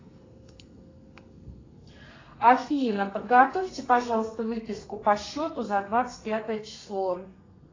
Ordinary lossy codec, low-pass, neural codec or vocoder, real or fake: AAC, 32 kbps; 7.2 kHz; codec, 32 kHz, 1.9 kbps, SNAC; fake